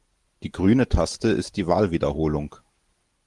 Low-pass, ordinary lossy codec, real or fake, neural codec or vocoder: 10.8 kHz; Opus, 24 kbps; real; none